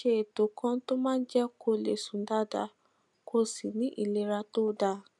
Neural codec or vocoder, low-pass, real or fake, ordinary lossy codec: none; none; real; none